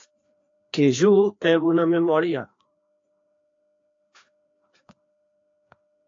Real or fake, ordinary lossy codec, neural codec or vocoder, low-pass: fake; AAC, 48 kbps; codec, 16 kHz, 2 kbps, FreqCodec, larger model; 7.2 kHz